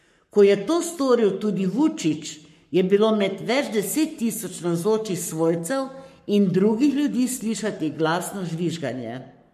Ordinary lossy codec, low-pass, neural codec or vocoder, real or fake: MP3, 64 kbps; 14.4 kHz; codec, 44.1 kHz, 7.8 kbps, Pupu-Codec; fake